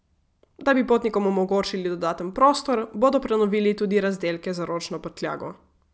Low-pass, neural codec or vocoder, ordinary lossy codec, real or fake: none; none; none; real